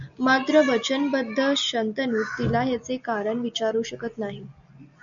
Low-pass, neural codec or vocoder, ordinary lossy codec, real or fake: 7.2 kHz; none; MP3, 96 kbps; real